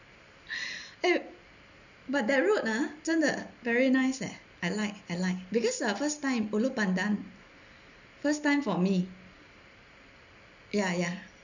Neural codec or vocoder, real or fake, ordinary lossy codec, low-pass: none; real; none; 7.2 kHz